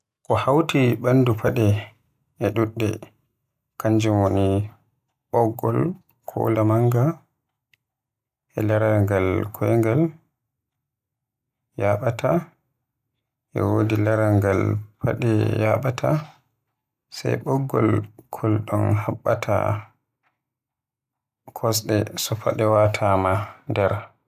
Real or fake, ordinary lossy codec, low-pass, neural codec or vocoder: real; none; 14.4 kHz; none